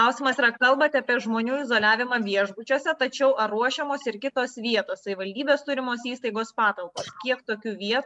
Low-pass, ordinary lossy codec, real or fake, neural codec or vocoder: 10.8 kHz; MP3, 96 kbps; real; none